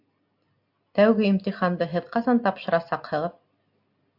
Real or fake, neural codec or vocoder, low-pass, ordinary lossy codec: real; none; 5.4 kHz; AAC, 48 kbps